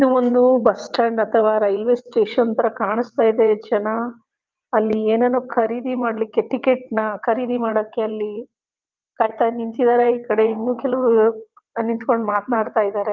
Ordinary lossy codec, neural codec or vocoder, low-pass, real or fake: Opus, 24 kbps; vocoder, 22.05 kHz, 80 mel bands, WaveNeXt; 7.2 kHz; fake